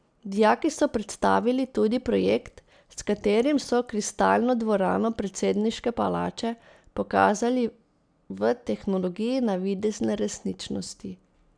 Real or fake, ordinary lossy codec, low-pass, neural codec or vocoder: real; none; 9.9 kHz; none